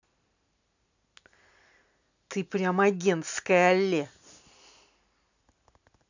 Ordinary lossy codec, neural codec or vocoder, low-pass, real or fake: none; none; 7.2 kHz; real